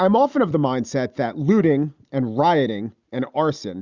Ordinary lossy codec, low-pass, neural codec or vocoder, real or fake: Opus, 64 kbps; 7.2 kHz; none; real